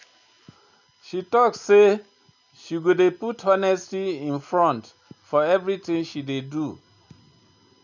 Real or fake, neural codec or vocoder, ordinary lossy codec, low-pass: real; none; none; 7.2 kHz